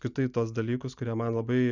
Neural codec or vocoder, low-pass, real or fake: none; 7.2 kHz; real